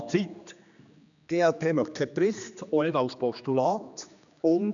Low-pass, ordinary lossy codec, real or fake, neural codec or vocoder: 7.2 kHz; none; fake; codec, 16 kHz, 2 kbps, X-Codec, HuBERT features, trained on general audio